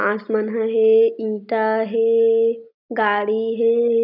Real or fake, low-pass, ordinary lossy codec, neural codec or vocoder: real; 5.4 kHz; none; none